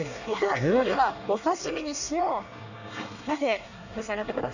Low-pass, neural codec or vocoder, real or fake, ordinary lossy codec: 7.2 kHz; codec, 24 kHz, 1 kbps, SNAC; fake; none